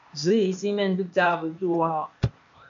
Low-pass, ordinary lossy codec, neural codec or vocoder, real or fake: 7.2 kHz; MP3, 48 kbps; codec, 16 kHz, 0.8 kbps, ZipCodec; fake